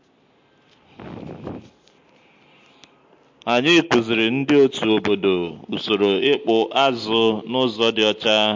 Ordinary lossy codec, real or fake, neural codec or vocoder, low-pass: MP3, 48 kbps; real; none; 7.2 kHz